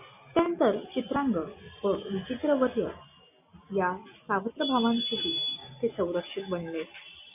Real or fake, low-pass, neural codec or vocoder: real; 3.6 kHz; none